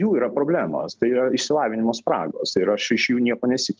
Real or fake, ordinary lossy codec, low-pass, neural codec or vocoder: real; Opus, 32 kbps; 7.2 kHz; none